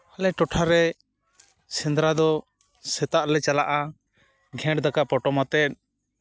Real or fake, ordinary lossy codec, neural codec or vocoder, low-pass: real; none; none; none